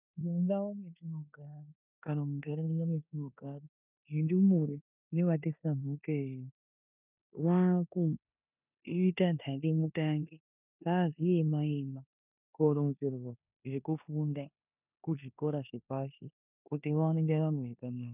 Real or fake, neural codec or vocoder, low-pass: fake; codec, 16 kHz in and 24 kHz out, 0.9 kbps, LongCat-Audio-Codec, four codebook decoder; 3.6 kHz